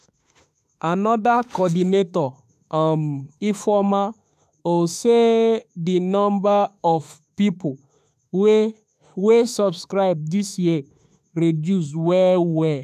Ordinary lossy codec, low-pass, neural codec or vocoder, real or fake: none; 14.4 kHz; autoencoder, 48 kHz, 32 numbers a frame, DAC-VAE, trained on Japanese speech; fake